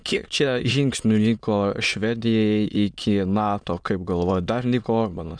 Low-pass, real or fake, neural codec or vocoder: 9.9 kHz; fake; autoencoder, 22.05 kHz, a latent of 192 numbers a frame, VITS, trained on many speakers